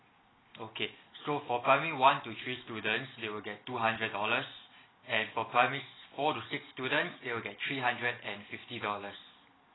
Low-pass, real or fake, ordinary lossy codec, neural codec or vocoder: 7.2 kHz; real; AAC, 16 kbps; none